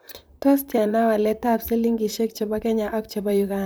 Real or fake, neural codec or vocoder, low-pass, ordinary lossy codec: fake; vocoder, 44.1 kHz, 128 mel bands, Pupu-Vocoder; none; none